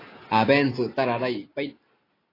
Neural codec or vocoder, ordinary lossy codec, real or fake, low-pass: none; AAC, 24 kbps; real; 5.4 kHz